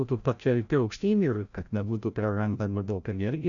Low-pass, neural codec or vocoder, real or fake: 7.2 kHz; codec, 16 kHz, 0.5 kbps, FreqCodec, larger model; fake